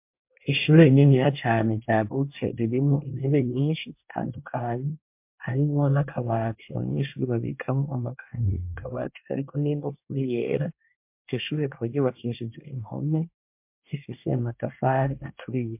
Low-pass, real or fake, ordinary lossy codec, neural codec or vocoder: 3.6 kHz; fake; MP3, 32 kbps; codec, 24 kHz, 1 kbps, SNAC